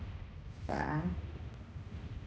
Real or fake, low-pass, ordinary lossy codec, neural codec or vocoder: fake; none; none; codec, 16 kHz, 1 kbps, X-Codec, HuBERT features, trained on balanced general audio